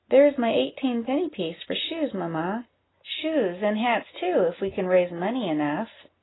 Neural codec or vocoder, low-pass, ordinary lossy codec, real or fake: none; 7.2 kHz; AAC, 16 kbps; real